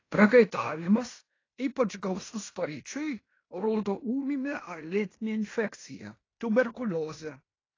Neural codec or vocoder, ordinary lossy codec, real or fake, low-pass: codec, 16 kHz in and 24 kHz out, 0.9 kbps, LongCat-Audio-Codec, fine tuned four codebook decoder; AAC, 32 kbps; fake; 7.2 kHz